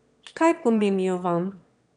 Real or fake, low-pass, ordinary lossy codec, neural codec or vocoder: fake; 9.9 kHz; none; autoencoder, 22.05 kHz, a latent of 192 numbers a frame, VITS, trained on one speaker